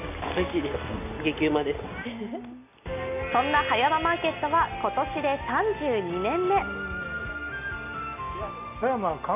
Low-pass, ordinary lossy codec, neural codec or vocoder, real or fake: 3.6 kHz; none; none; real